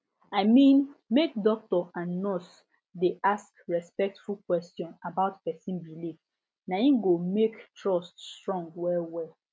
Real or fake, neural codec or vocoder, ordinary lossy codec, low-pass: real; none; none; none